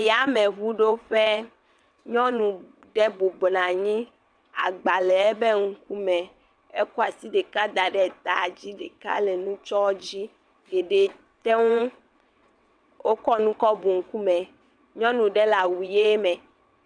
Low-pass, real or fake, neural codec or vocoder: 9.9 kHz; fake; vocoder, 22.05 kHz, 80 mel bands, WaveNeXt